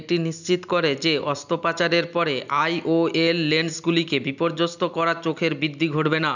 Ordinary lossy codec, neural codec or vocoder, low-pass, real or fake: none; none; 7.2 kHz; real